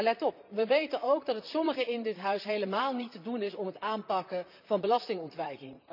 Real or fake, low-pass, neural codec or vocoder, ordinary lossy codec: fake; 5.4 kHz; vocoder, 44.1 kHz, 128 mel bands, Pupu-Vocoder; none